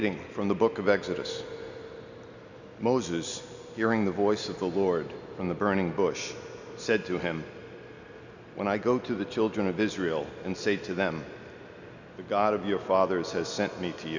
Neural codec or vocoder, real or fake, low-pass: none; real; 7.2 kHz